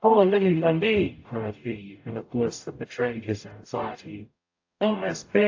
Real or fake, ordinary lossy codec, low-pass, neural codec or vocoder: fake; AAC, 48 kbps; 7.2 kHz; codec, 44.1 kHz, 0.9 kbps, DAC